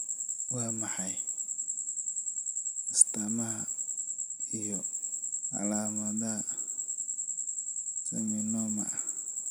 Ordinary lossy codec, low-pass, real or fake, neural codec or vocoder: none; none; real; none